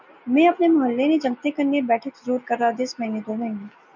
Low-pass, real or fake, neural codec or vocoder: 7.2 kHz; real; none